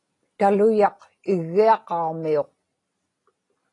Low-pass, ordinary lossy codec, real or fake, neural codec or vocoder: 10.8 kHz; AAC, 32 kbps; real; none